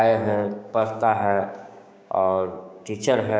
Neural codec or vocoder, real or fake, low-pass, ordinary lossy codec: codec, 16 kHz, 6 kbps, DAC; fake; none; none